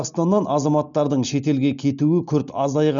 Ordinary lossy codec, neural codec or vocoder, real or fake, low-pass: none; none; real; 7.2 kHz